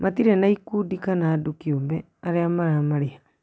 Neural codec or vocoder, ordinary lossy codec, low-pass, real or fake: none; none; none; real